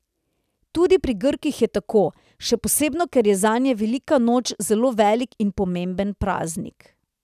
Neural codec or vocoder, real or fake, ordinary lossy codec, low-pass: none; real; none; 14.4 kHz